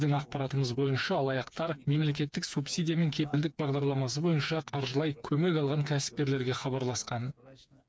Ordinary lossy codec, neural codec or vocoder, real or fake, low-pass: none; codec, 16 kHz, 4 kbps, FreqCodec, smaller model; fake; none